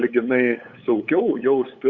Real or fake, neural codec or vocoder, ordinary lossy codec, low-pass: fake; codec, 16 kHz, 8 kbps, FunCodec, trained on LibriTTS, 25 frames a second; Opus, 64 kbps; 7.2 kHz